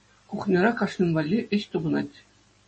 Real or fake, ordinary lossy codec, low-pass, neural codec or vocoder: real; MP3, 32 kbps; 10.8 kHz; none